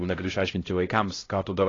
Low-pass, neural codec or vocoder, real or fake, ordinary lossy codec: 7.2 kHz; codec, 16 kHz, 0.5 kbps, X-Codec, HuBERT features, trained on LibriSpeech; fake; AAC, 32 kbps